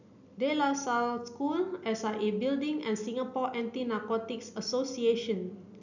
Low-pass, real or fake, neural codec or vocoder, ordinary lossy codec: 7.2 kHz; real; none; none